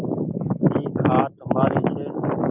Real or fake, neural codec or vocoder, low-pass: real; none; 3.6 kHz